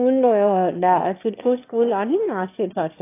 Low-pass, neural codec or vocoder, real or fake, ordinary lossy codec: 3.6 kHz; autoencoder, 22.05 kHz, a latent of 192 numbers a frame, VITS, trained on one speaker; fake; AAC, 24 kbps